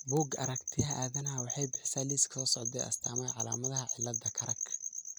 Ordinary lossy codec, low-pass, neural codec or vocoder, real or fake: none; none; none; real